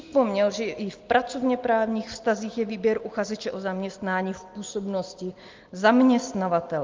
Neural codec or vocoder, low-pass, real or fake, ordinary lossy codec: none; 7.2 kHz; real; Opus, 32 kbps